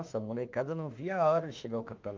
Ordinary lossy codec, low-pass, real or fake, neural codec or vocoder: Opus, 24 kbps; 7.2 kHz; fake; autoencoder, 48 kHz, 32 numbers a frame, DAC-VAE, trained on Japanese speech